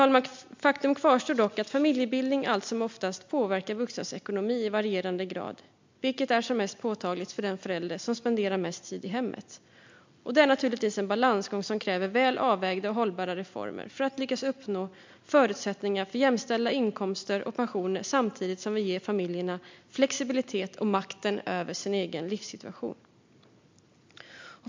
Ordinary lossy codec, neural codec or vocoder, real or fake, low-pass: MP3, 64 kbps; none; real; 7.2 kHz